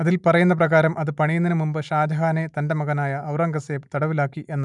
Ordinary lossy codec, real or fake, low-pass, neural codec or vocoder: none; real; 10.8 kHz; none